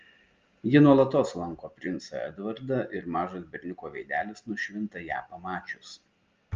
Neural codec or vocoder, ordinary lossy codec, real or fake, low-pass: none; Opus, 24 kbps; real; 7.2 kHz